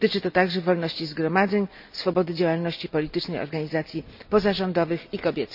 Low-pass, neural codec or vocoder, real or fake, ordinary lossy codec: 5.4 kHz; none; real; none